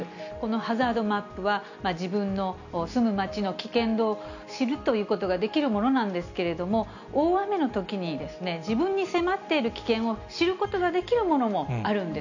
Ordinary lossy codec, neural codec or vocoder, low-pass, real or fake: none; none; 7.2 kHz; real